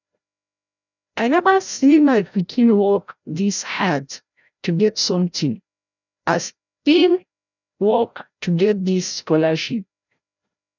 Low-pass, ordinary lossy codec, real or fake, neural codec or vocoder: 7.2 kHz; none; fake; codec, 16 kHz, 0.5 kbps, FreqCodec, larger model